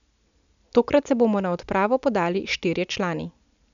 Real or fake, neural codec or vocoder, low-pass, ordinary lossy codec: real; none; 7.2 kHz; none